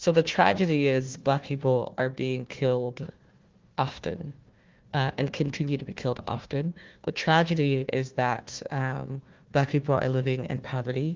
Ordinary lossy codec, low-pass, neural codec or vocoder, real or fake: Opus, 32 kbps; 7.2 kHz; codec, 16 kHz, 1 kbps, FunCodec, trained on Chinese and English, 50 frames a second; fake